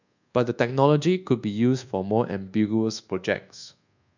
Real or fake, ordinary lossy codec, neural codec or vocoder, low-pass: fake; none; codec, 24 kHz, 1.2 kbps, DualCodec; 7.2 kHz